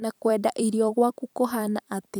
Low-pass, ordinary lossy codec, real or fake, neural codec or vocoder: none; none; fake; vocoder, 44.1 kHz, 128 mel bands every 256 samples, BigVGAN v2